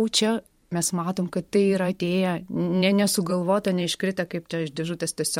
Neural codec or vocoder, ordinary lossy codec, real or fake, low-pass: vocoder, 44.1 kHz, 128 mel bands every 512 samples, BigVGAN v2; MP3, 64 kbps; fake; 19.8 kHz